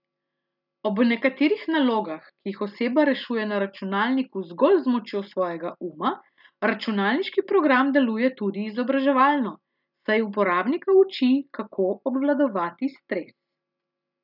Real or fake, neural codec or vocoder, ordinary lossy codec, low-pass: real; none; none; 5.4 kHz